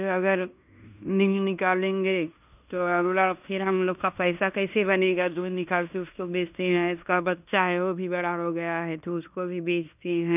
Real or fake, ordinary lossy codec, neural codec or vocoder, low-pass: fake; none; codec, 16 kHz in and 24 kHz out, 0.9 kbps, LongCat-Audio-Codec, fine tuned four codebook decoder; 3.6 kHz